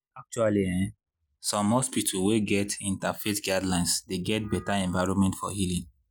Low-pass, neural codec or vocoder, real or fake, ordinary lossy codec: none; none; real; none